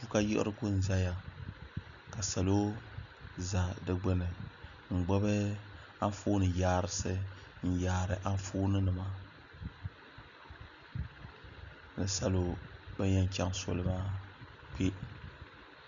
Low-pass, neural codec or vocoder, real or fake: 7.2 kHz; none; real